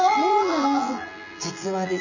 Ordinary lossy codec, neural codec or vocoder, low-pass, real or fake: none; vocoder, 24 kHz, 100 mel bands, Vocos; 7.2 kHz; fake